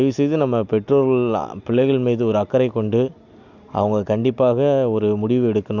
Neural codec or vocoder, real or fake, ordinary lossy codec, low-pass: none; real; none; 7.2 kHz